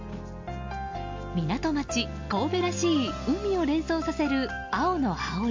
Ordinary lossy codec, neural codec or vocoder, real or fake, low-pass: MP3, 48 kbps; none; real; 7.2 kHz